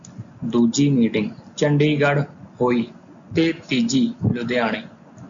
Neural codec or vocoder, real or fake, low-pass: none; real; 7.2 kHz